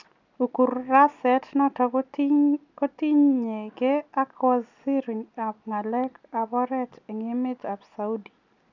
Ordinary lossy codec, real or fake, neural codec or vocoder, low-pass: none; real; none; 7.2 kHz